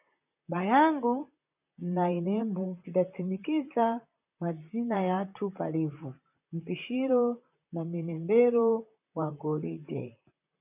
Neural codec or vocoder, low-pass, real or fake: vocoder, 44.1 kHz, 128 mel bands, Pupu-Vocoder; 3.6 kHz; fake